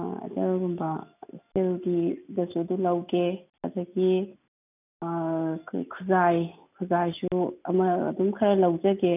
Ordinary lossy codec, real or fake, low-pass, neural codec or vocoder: none; real; 3.6 kHz; none